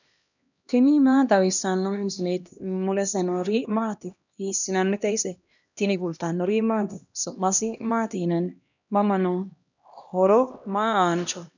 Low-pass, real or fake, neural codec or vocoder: 7.2 kHz; fake; codec, 16 kHz, 1 kbps, X-Codec, HuBERT features, trained on LibriSpeech